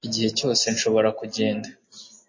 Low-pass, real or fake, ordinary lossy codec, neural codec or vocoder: 7.2 kHz; real; MP3, 32 kbps; none